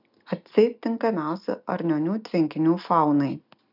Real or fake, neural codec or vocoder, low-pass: real; none; 5.4 kHz